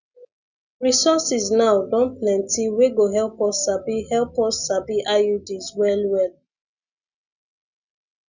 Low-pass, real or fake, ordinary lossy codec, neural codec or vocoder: 7.2 kHz; real; none; none